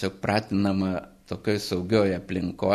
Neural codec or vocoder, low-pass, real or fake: none; 14.4 kHz; real